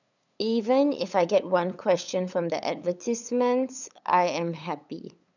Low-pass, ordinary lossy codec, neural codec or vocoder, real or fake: 7.2 kHz; none; codec, 16 kHz, 8 kbps, FunCodec, trained on LibriTTS, 25 frames a second; fake